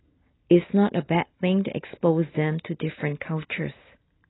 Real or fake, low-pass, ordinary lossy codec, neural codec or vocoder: real; 7.2 kHz; AAC, 16 kbps; none